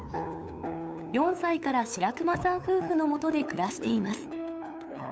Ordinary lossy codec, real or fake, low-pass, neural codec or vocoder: none; fake; none; codec, 16 kHz, 8 kbps, FunCodec, trained on LibriTTS, 25 frames a second